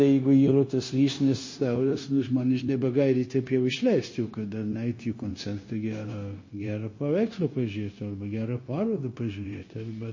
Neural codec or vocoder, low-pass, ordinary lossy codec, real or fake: codec, 16 kHz, 0.9 kbps, LongCat-Audio-Codec; 7.2 kHz; MP3, 32 kbps; fake